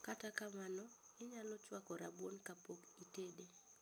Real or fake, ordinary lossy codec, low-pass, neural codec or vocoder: real; none; none; none